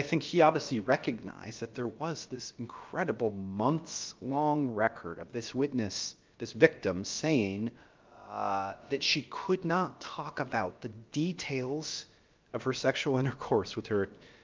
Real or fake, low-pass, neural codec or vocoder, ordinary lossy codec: fake; 7.2 kHz; codec, 16 kHz, about 1 kbps, DyCAST, with the encoder's durations; Opus, 24 kbps